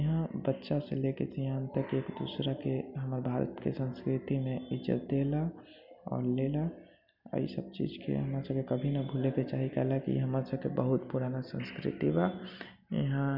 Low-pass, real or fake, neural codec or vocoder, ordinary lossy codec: 5.4 kHz; real; none; none